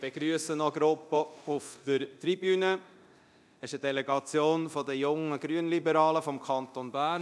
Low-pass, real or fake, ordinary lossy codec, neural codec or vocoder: none; fake; none; codec, 24 kHz, 0.9 kbps, DualCodec